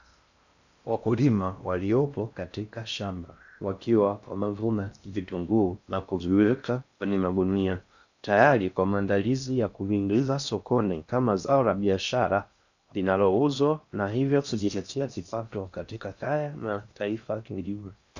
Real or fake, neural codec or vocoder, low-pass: fake; codec, 16 kHz in and 24 kHz out, 0.6 kbps, FocalCodec, streaming, 2048 codes; 7.2 kHz